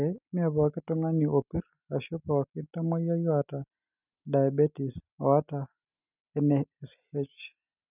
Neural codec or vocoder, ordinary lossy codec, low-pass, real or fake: none; none; 3.6 kHz; real